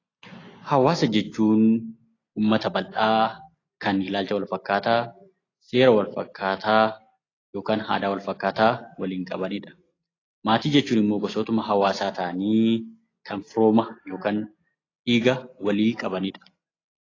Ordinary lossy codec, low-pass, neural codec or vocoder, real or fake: AAC, 32 kbps; 7.2 kHz; none; real